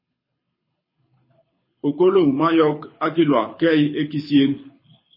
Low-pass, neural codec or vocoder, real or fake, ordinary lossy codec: 5.4 kHz; codec, 24 kHz, 6 kbps, HILCodec; fake; MP3, 24 kbps